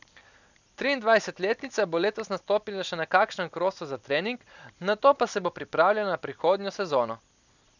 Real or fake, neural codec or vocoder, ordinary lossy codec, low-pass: real; none; none; 7.2 kHz